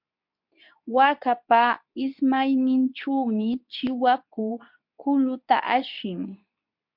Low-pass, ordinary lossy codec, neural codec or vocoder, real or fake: 5.4 kHz; MP3, 48 kbps; codec, 24 kHz, 0.9 kbps, WavTokenizer, medium speech release version 2; fake